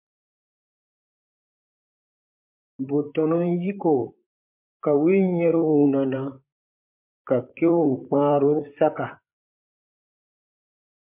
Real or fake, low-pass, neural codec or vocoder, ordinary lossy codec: fake; 3.6 kHz; vocoder, 44.1 kHz, 128 mel bands, Pupu-Vocoder; MP3, 32 kbps